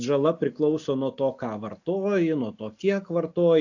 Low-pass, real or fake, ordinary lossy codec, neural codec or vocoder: 7.2 kHz; real; AAC, 48 kbps; none